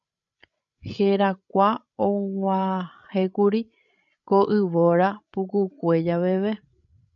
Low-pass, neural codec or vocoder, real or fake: 7.2 kHz; codec, 16 kHz, 16 kbps, FreqCodec, larger model; fake